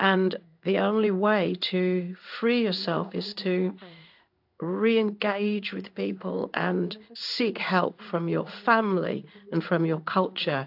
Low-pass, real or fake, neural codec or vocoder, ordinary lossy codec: 5.4 kHz; fake; codec, 16 kHz in and 24 kHz out, 1 kbps, XY-Tokenizer; AAC, 48 kbps